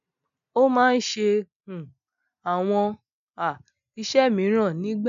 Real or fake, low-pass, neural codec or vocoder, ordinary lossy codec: real; 7.2 kHz; none; none